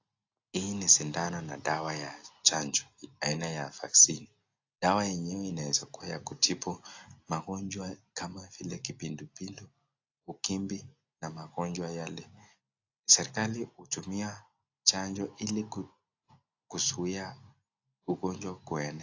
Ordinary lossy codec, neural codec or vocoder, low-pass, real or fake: AAC, 48 kbps; none; 7.2 kHz; real